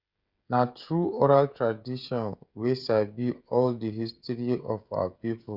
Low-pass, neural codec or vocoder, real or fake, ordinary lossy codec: 5.4 kHz; codec, 16 kHz, 16 kbps, FreqCodec, smaller model; fake; Opus, 64 kbps